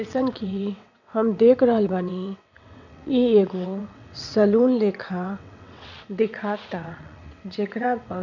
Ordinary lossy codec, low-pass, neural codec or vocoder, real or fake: none; 7.2 kHz; vocoder, 22.05 kHz, 80 mel bands, WaveNeXt; fake